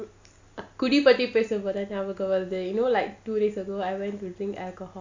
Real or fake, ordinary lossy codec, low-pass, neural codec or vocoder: real; AAC, 48 kbps; 7.2 kHz; none